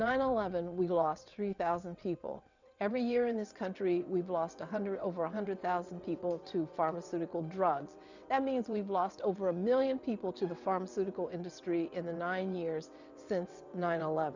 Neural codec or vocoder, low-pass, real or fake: vocoder, 22.05 kHz, 80 mel bands, WaveNeXt; 7.2 kHz; fake